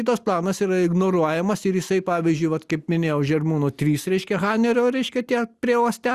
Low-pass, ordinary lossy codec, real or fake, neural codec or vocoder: 14.4 kHz; Opus, 64 kbps; real; none